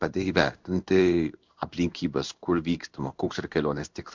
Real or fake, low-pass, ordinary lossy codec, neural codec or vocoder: fake; 7.2 kHz; MP3, 64 kbps; codec, 16 kHz in and 24 kHz out, 1 kbps, XY-Tokenizer